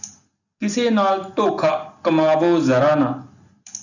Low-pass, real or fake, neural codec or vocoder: 7.2 kHz; real; none